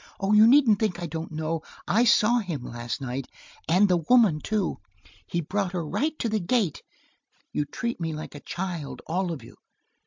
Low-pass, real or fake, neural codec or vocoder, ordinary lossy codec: 7.2 kHz; real; none; MP3, 64 kbps